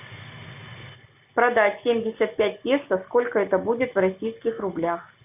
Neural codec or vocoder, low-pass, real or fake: none; 3.6 kHz; real